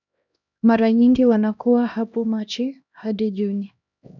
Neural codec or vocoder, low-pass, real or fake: codec, 16 kHz, 1 kbps, X-Codec, HuBERT features, trained on LibriSpeech; 7.2 kHz; fake